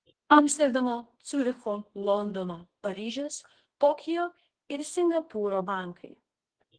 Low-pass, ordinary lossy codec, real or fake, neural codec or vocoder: 9.9 kHz; Opus, 16 kbps; fake; codec, 24 kHz, 0.9 kbps, WavTokenizer, medium music audio release